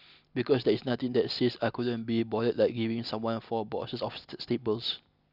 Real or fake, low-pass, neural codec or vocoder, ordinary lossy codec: real; 5.4 kHz; none; Opus, 64 kbps